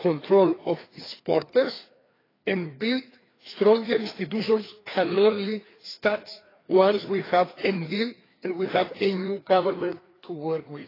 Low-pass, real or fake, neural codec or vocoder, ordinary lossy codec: 5.4 kHz; fake; codec, 16 kHz, 2 kbps, FreqCodec, larger model; AAC, 24 kbps